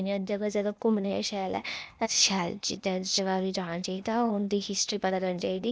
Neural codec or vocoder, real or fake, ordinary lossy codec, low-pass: codec, 16 kHz, 0.8 kbps, ZipCodec; fake; none; none